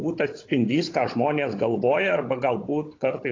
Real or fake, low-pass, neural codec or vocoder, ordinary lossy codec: fake; 7.2 kHz; codec, 16 kHz, 16 kbps, FunCodec, trained on Chinese and English, 50 frames a second; AAC, 32 kbps